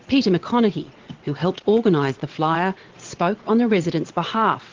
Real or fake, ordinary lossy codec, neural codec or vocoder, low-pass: fake; Opus, 16 kbps; vocoder, 22.05 kHz, 80 mel bands, WaveNeXt; 7.2 kHz